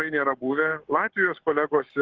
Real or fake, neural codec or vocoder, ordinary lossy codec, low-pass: fake; codec, 44.1 kHz, 7.8 kbps, Pupu-Codec; Opus, 16 kbps; 7.2 kHz